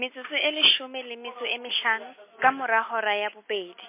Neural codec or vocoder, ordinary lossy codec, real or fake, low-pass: none; MP3, 24 kbps; real; 3.6 kHz